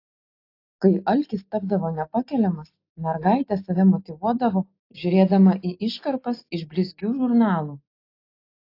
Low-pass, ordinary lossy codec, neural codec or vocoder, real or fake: 5.4 kHz; AAC, 32 kbps; none; real